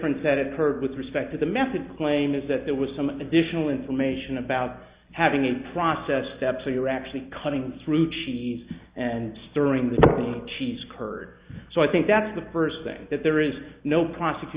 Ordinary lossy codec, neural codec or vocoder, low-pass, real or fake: Opus, 64 kbps; none; 3.6 kHz; real